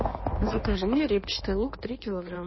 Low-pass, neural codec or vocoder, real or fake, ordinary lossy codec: 7.2 kHz; codec, 16 kHz in and 24 kHz out, 1.1 kbps, FireRedTTS-2 codec; fake; MP3, 24 kbps